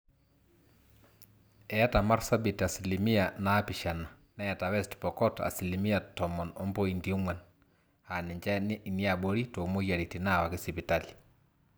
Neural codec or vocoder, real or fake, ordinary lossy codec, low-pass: none; real; none; none